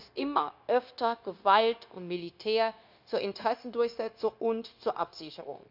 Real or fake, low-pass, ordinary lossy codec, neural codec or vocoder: fake; 5.4 kHz; none; codec, 16 kHz, 0.9 kbps, LongCat-Audio-Codec